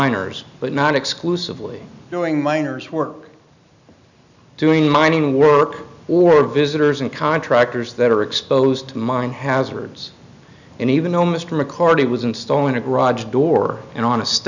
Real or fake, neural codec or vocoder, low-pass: real; none; 7.2 kHz